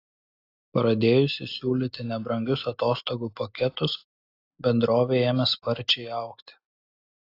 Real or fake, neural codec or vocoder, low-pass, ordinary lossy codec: real; none; 5.4 kHz; AAC, 32 kbps